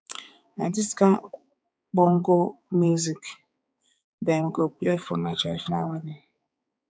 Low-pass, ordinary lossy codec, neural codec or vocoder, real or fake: none; none; codec, 16 kHz, 4 kbps, X-Codec, HuBERT features, trained on balanced general audio; fake